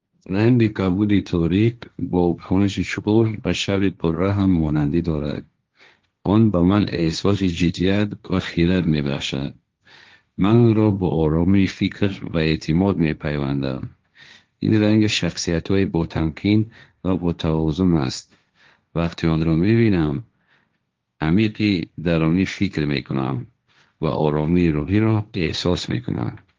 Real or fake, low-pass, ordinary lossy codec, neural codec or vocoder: fake; 7.2 kHz; Opus, 32 kbps; codec, 16 kHz, 1.1 kbps, Voila-Tokenizer